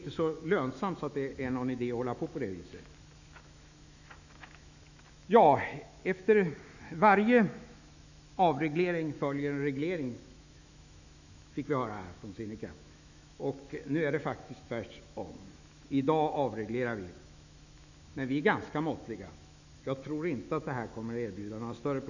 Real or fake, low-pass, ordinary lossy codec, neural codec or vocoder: fake; 7.2 kHz; none; autoencoder, 48 kHz, 128 numbers a frame, DAC-VAE, trained on Japanese speech